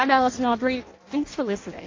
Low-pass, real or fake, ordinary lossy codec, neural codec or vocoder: 7.2 kHz; fake; AAC, 32 kbps; codec, 16 kHz in and 24 kHz out, 0.6 kbps, FireRedTTS-2 codec